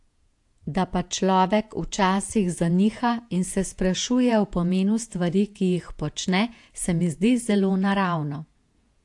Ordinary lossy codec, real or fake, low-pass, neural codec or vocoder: AAC, 64 kbps; fake; 10.8 kHz; vocoder, 48 kHz, 128 mel bands, Vocos